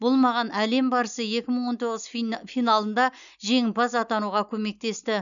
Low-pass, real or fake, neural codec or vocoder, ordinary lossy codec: 7.2 kHz; real; none; none